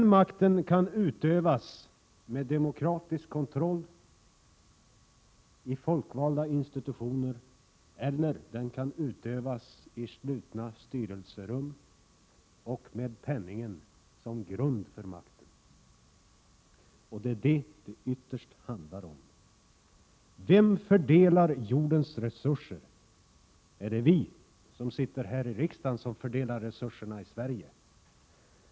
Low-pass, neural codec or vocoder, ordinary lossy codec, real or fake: none; none; none; real